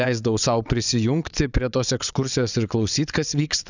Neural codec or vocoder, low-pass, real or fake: vocoder, 22.05 kHz, 80 mel bands, WaveNeXt; 7.2 kHz; fake